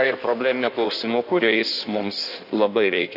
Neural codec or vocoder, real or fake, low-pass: codec, 16 kHz in and 24 kHz out, 1.1 kbps, FireRedTTS-2 codec; fake; 5.4 kHz